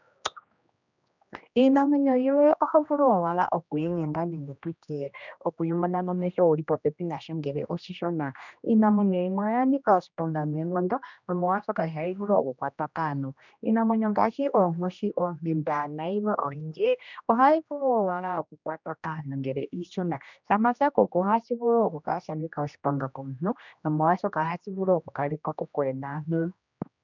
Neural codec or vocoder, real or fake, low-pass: codec, 16 kHz, 1 kbps, X-Codec, HuBERT features, trained on general audio; fake; 7.2 kHz